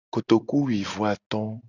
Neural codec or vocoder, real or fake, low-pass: none; real; 7.2 kHz